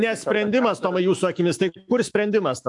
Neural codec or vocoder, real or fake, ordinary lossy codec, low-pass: autoencoder, 48 kHz, 128 numbers a frame, DAC-VAE, trained on Japanese speech; fake; AAC, 64 kbps; 10.8 kHz